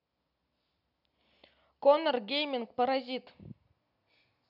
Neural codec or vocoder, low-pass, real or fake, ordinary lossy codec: none; 5.4 kHz; real; none